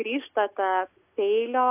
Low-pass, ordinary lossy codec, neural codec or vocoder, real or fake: 3.6 kHz; AAC, 32 kbps; none; real